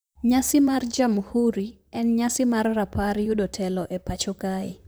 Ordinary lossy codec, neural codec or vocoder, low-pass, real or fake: none; vocoder, 44.1 kHz, 128 mel bands, Pupu-Vocoder; none; fake